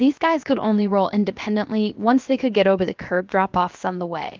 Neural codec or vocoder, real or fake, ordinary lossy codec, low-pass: codec, 16 kHz, 0.7 kbps, FocalCodec; fake; Opus, 24 kbps; 7.2 kHz